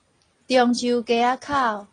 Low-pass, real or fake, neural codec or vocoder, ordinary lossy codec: 9.9 kHz; real; none; AAC, 32 kbps